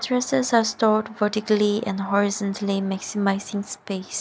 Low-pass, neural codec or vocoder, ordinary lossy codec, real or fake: none; none; none; real